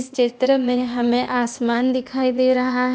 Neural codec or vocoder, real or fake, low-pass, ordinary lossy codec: codec, 16 kHz, 0.8 kbps, ZipCodec; fake; none; none